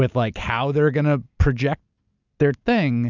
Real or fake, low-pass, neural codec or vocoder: real; 7.2 kHz; none